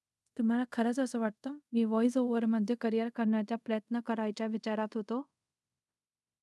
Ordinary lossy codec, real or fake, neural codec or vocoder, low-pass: none; fake; codec, 24 kHz, 0.5 kbps, DualCodec; none